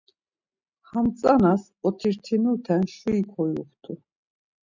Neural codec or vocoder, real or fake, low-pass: none; real; 7.2 kHz